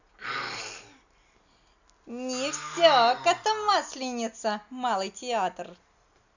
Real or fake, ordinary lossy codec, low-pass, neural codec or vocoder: real; none; 7.2 kHz; none